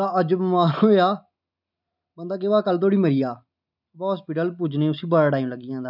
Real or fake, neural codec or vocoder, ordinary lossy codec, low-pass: real; none; none; 5.4 kHz